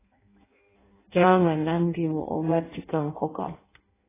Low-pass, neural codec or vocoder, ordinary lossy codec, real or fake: 3.6 kHz; codec, 16 kHz in and 24 kHz out, 0.6 kbps, FireRedTTS-2 codec; AAC, 16 kbps; fake